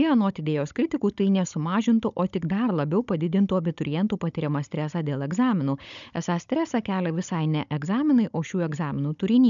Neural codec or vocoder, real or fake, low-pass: codec, 16 kHz, 16 kbps, FunCodec, trained on Chinese and English, 50 frames a second; fake; 7.2 kHz